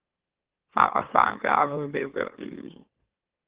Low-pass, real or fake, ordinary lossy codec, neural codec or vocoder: 3.6 kHz; fake; Opus, 16 kbps; autoencoder, 44.1 kHz, a latent of 192 numbers a frame, MeloTTS